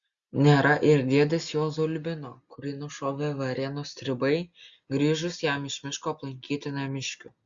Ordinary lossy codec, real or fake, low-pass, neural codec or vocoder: Opus, 64 kbps; real; 7.2 kHz; none